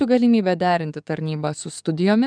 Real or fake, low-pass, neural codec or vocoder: fake; 9.9 kHz; codec, 44.1 kHz, 7.8 kbps, DAC